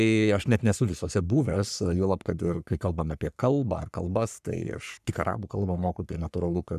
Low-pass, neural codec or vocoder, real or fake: 14.4 kHz; codec, 44.1 kHz, 3.4 kbps, Pupu-Codec; fake